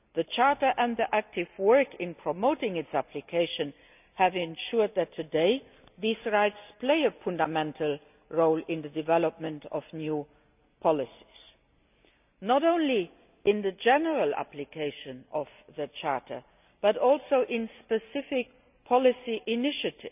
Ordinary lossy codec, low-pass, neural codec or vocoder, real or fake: none; 3.6 kHz; none; real